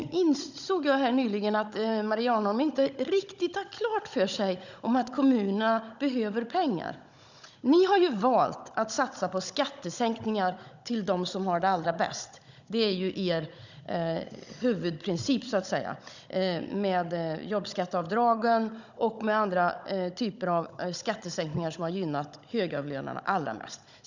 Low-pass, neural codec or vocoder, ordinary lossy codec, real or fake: 7.2 kHz; codec, 16 kHz, 16 kbps, FunCodec, trained on Chinese and English, 50 frames a second; none; fake